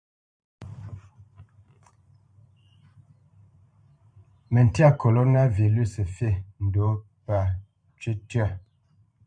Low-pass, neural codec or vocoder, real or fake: 9.9 kHz; vocoder, 44.1 kHz, 128 mel bands every 512 samples, BigVGAN v2; fake